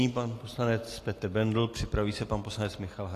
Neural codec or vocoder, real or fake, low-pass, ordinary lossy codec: none; real; 14.4 kHz; AAC, 48 kbps